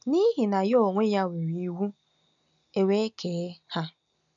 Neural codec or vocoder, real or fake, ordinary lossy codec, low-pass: none; real; none; 7.2 kHz